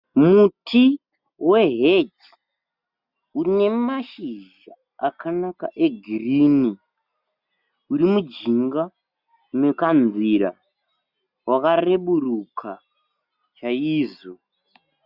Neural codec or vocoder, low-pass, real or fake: none; 5.4 kHz; real